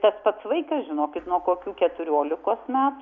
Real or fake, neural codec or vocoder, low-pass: real; none; 7.2 kHz